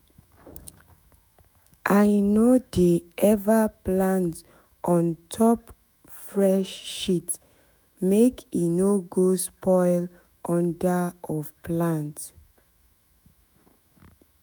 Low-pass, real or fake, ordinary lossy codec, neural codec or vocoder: none; fake; none; autoencoder, 48 kHz, 128 numbers a frame, DAC-VAE, trained on Japanese speech